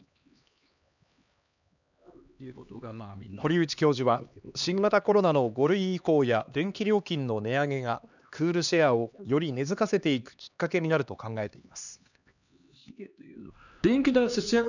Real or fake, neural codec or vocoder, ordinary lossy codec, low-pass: fake; codec, 16 kHz, 2 kbps, X-Codec, HuBERT features, trained on LibriSpeech; none; 7.2 kHz